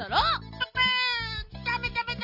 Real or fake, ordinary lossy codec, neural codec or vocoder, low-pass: real; none; none; 5.4 kHz